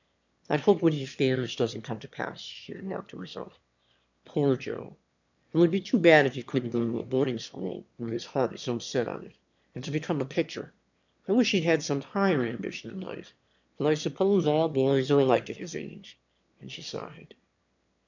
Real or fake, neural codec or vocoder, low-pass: fake; autoencoder, 22.05 kHz, a latent of 192 numbers a frame, VITS, trained on one speaker; 7.2 kHz